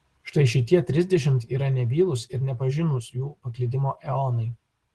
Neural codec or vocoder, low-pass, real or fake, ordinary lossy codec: none; 10.8 kHz; real; Opus, 16 kbps